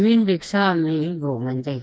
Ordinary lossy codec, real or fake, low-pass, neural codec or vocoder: none; fake; none; codec, 16 kHz, 2 kbps, FreqCodec, smaller model